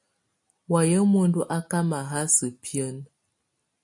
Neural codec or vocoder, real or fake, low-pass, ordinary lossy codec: none; real; 10.8 kHz; MP3, 48 kbps